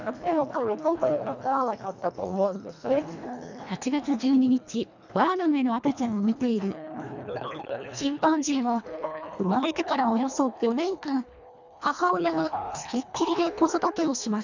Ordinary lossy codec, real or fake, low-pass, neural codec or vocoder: none; fake; 7.2 kHz; codec, 24 kHz, 1.5 kbps, HILCodec